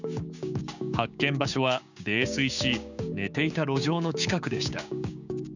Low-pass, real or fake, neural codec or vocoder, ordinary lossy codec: 7.2 kHz; fake; codec, 16 kHz, 6 kbps, DAC; none